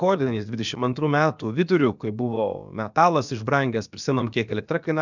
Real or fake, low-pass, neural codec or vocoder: fake; 7.2 kHz; codec, 16 kHz, about 1 kbps, DyCAST, with the encoder's durations